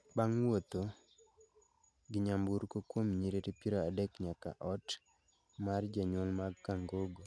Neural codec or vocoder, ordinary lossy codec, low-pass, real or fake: none; none; none; real